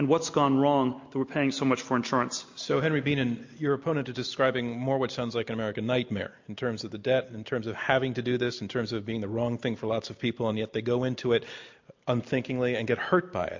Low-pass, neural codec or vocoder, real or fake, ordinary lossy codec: 7.2 kHz; none; real; MP3, 48 kbps